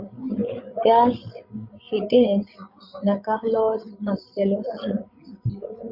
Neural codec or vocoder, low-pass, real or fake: codec, 16 kHz, 16 kbps, FreqCodec, larger model; 5.4 kHz; fake